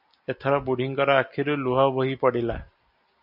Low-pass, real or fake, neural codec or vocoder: 5.4 kHz; real; none